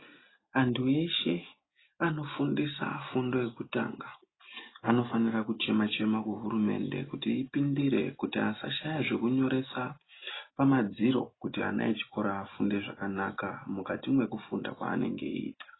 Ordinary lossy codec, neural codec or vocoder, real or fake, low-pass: AAC, 16 kbps; none; real; 7.2 kHz